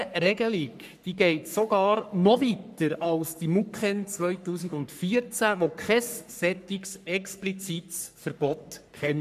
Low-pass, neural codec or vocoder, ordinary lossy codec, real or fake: 14.4 kHz; codec, 44.1 kHz, 3.4 kbps, Pupu-Codec; none; fake